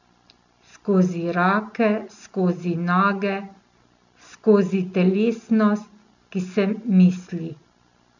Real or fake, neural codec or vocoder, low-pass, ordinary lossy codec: real; none; 7.2 kHz; none